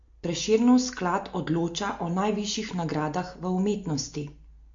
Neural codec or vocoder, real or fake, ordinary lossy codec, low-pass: none; real; MP3, 48 kbps; 7.2 kHz